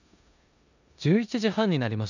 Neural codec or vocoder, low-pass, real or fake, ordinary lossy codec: codec, 16 kHz in and 24 kHz out, 0.9 kbps, LongCat-Audio-Codec, four codebook decoder; 7.2 kHz; fake; none